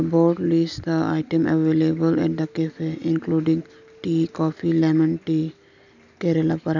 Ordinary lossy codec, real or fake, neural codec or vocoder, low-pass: none; real; none; 7.2 kHz